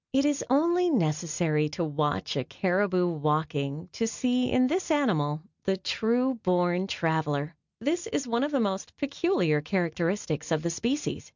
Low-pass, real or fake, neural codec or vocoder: 7.2 kHz; real; none